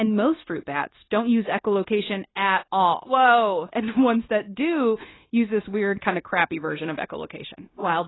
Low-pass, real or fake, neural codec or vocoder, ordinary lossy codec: 7.2 kHz; fake; codec, 16 kHz, 0.9 kbps, LongCat-Audio-Codec; AAC, 16 kbps